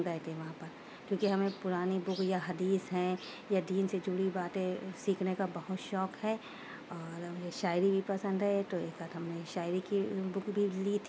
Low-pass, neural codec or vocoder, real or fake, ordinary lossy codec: none; none; real; none